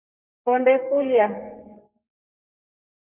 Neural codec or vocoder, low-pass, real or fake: codec, 32 kHz, 1.9 kbps, SNAC; 3.6 kHz; fake